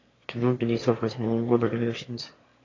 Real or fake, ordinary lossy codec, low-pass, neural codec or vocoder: fake; AAC, 32 kbps; 7.2 kHz; autoencoder, 22.05 kHz, a latent of 192 numbers a frame, VITS, trained on one speaker